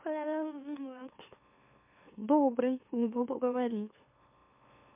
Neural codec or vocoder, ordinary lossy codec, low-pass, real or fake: autoencoder, 44.1 kHz, a latent of 192 numbers a frame, MeloTTS; MP3, 32 kbps; 3.6 kHz; fake